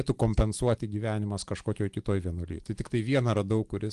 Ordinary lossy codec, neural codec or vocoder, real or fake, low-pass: Opus, 24 kbps; none; real; 10.8 kHz